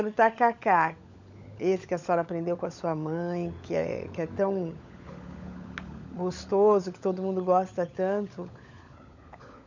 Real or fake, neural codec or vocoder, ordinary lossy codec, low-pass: fake; codec, 16 kHz, 16 kbps, FunCodec, trained on LibriTTS, 50 frames a second; none; 7.2 kHz